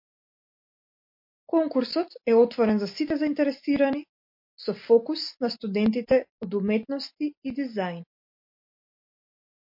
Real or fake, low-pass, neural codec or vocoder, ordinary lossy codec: real; 5.4 kHz; none; MP3, 32 kbps